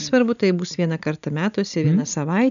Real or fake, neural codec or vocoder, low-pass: real; none; 7.2 kHz